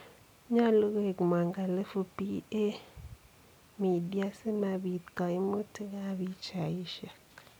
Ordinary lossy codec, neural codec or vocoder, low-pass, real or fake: none; none; none; real